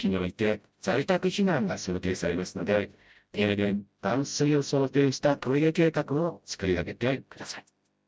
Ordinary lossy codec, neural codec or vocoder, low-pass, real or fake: none; codec, 16 kHz, 0.5 kbps, FreqCodec, smaller model; none; fake